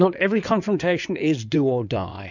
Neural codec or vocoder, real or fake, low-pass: codec, 16 kHz in and 24 kHz out, 2.2 kbps, FireRedTTS-2 codec; fake; 7.2 kHz